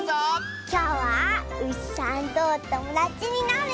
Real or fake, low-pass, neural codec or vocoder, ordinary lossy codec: real; none; none; none